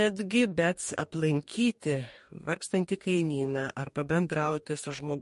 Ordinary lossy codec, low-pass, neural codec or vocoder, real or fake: MP3, 48 kbps; 14.4 kHz; codec, 44.1 kHz, 2.6 kbps, DAC; fake